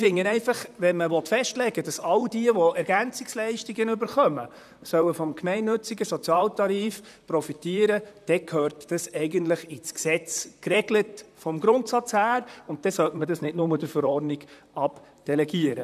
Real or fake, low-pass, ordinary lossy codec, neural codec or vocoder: fake; 14.4 kHz; none; vocoder, 44.1 kHz, 128 mel bands, Pupu-Vocoder